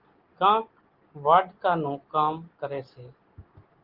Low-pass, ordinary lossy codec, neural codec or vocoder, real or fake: 5.4 kHz; Opus, 16 kbps; none; real